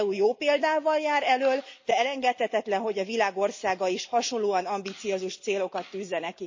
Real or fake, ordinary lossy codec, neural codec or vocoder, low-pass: real; MP3, 32 kbps; none; 7.2 kHz